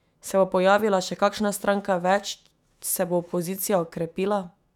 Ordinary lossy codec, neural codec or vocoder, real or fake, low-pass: none; autoencoder, 48 kHz, 128 numbers a frame, DAC-VAE, trained on Japanese speech; fake; 19.8 kHz